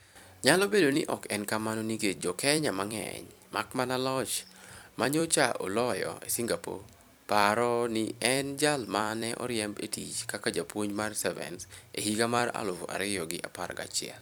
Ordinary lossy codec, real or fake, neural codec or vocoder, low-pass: none; fake; vocoder, 44.1 kHz, 128 mel bands every 256 samples, BigVGAN v2; none